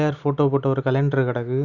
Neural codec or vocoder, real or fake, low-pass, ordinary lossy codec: none; real; 7.2 kHz; none